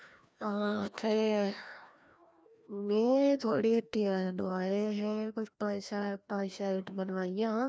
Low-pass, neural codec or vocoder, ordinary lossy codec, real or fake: none; codec, 16 kHz, 1 kbps, FreqCodec, larger model; none; fake